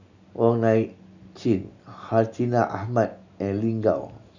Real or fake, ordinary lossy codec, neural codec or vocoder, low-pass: real; none; none; 7.2 kHz